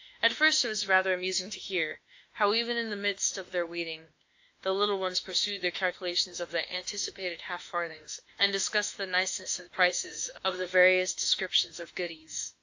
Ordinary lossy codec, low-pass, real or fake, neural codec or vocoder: AAC, 48 kbps; 7.2 kHz; fake; autoencoder, 48 kHz, 32 numbers a frame, DAC-VAE, trained on Japanese speech